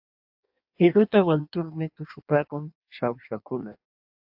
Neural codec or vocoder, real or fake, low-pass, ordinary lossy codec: codec, 16 kHz in and 24 kHz out, 1.1 kbps, FireRedTTS-2 codec; fake; 5.4 kHz; AAC, 48 kbps